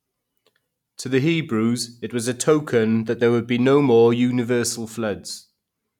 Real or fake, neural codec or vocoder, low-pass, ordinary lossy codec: real; none; 19.8 kHz; none